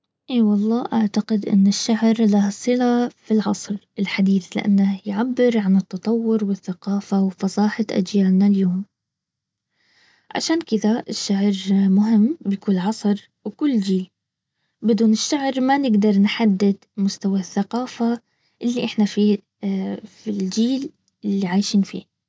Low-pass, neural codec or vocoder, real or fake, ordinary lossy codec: none; none; real; none